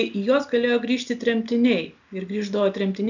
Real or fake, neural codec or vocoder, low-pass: real; none; 7.2 kHz